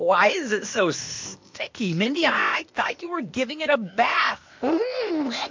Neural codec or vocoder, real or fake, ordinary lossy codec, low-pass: codec, 16 kHz, 0.8 kbps, ZipCodec; fake; MP3, 48 kbps; 7.2 kHz